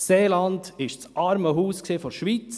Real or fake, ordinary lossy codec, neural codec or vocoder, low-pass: real; none; none; none